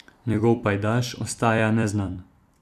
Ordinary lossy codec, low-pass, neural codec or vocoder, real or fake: none; 14.4 kHz; vocoder, 44.1 kHz, 128 mel bands every 256 samples, BigVGAN v2; fake